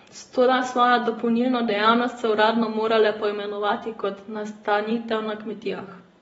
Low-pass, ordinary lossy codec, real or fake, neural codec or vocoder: 19.8 kHz; AAC, 24 kbps; real; none